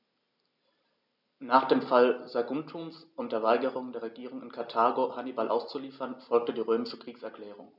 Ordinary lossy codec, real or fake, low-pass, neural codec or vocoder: none; real; 5.4 kHz; none